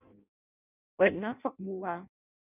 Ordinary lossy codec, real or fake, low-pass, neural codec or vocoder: none; fake; 3.6 kHz; codec, 16 kHz in and 24 kHz out, 0.6 kbps, FireRedTTS-2 codec